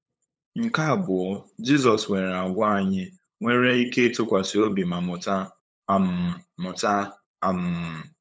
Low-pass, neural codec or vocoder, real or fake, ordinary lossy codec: none; codec, 16 kHz, 8 kbps, FunCodec, trained on LibriTTS, 25 frames a second; fake; none